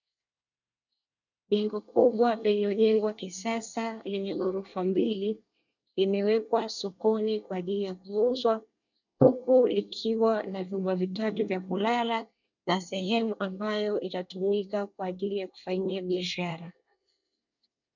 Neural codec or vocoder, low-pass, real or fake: codec, 24 kHz, 1 kbps, SNAC; 7.2 kHz; fake